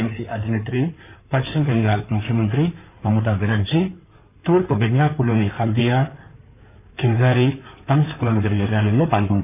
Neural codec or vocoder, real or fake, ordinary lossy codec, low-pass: codec, 16 kHz in and 24 kHz out, 2.2 kbps, FireRedTTS-2 codec; fake; none; 3.6 kHz